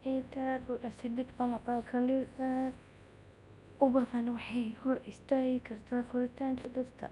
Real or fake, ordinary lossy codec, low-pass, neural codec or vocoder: fake; none; 10.8 kHz; codec, 24 kHz, 0.9 kbps, WavTokenizer, large speech release